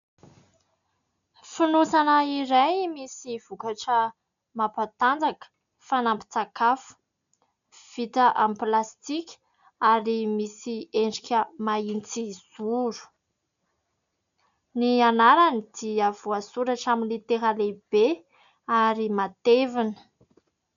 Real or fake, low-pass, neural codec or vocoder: real; 7.2 kHz; none